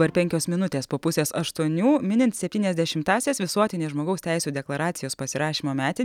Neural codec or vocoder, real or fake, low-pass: none; real; 19.8 kHz